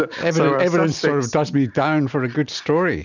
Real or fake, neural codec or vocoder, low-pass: real; none; 7.2 kHz